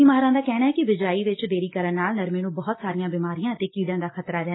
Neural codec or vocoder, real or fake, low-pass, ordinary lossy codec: none; real; 7.2 kHz; AAC, 16 kbps